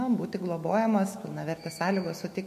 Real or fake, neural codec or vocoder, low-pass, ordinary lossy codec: fake; autoencoder, 48 kHz, 128 numbers a frame, DAC-VAE, trained on Japanese speech; 14.4 kHz; MP3, 64 kbps